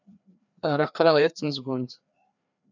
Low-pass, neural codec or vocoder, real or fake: 7.2 kHz; codec, 16 kHz, 2 kbps, FreqCodec, larger model; fake